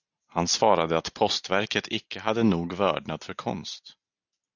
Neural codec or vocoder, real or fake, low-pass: none; real; 7.2 kHz